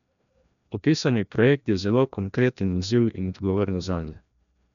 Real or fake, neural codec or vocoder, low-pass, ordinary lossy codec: fake; codec, 16 kHz, 1 kbps, FreqCodec, larger model; 7.2 kHz; none